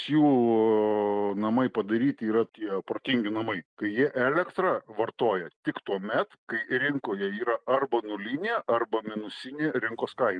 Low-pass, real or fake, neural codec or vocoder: 9.9 kHz; real; none